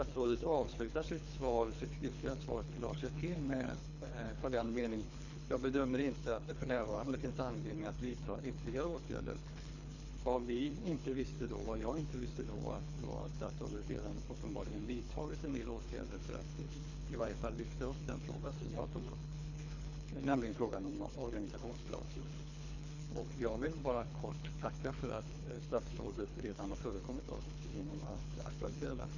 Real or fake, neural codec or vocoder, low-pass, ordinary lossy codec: fake; codec, 24 kHz, 3 kbps, HILCodec; 7.2 kHz; none